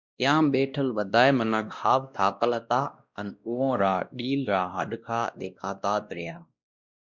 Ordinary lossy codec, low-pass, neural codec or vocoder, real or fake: Opus, 64 kbps; 7.2 kHz; codec, 16 kHz, 1 kbps, X-Codec, WavLM features, trained on Multilingual LibriSpeech; fake